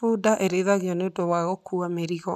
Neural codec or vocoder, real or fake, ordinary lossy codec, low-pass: none; real; none; 14.4 kHz